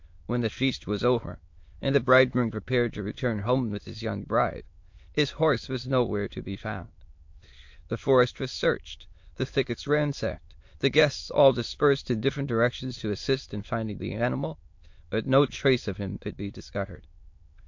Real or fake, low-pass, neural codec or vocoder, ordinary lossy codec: fake; 7.2 kHz; autoencoder, 22.05 kHz, a latent of 192 numbers a frame, VITS, trained on many speakers; MP3, 48 kbps